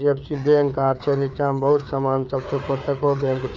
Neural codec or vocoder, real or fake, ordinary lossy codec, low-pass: codec, 16 kHz, 4 kbps, FreqCodec, larger model; fake; none; none